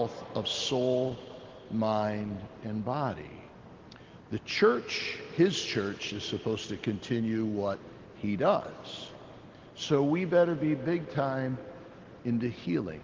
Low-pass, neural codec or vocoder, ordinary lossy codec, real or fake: 7.2 kHz; none; Opus, 16 kbps; real